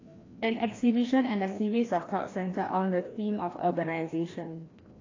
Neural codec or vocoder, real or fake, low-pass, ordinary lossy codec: codec, 16 kHz, 1 kbps, FreqCodec, larger model; fake; 7.2 kHz; AAC, 32 kbps